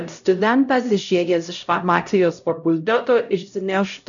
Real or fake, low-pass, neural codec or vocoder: fake; 7.2 kHz; codec, 16 kHz, 0.5 kbps, X-Codec, HuBERT features, trained on LibriSpeech